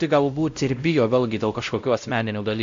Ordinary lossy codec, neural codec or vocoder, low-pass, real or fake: AAC, 64 kbps; codec, 16 kHz, 0.5 kbps, X-Codec, WavLM features, trained on Multilingual LibriSpeech; 7.2 kHz; fake